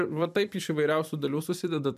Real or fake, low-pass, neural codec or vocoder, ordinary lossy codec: fake; 14.4 kHz; vocoder, 44.1 kHz, 128 mel bands every 256 samples, BigVGAN v2; MP3, 96 kbps